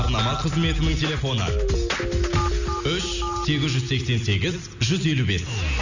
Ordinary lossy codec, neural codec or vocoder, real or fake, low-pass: none; none; real; 7.2 kHz